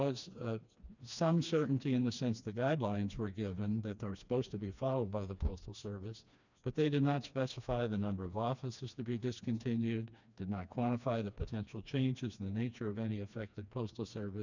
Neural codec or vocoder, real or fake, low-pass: codec, 16 kHz, 2 kbps, FreqCodec, smaller model; fake; 7.2 kHz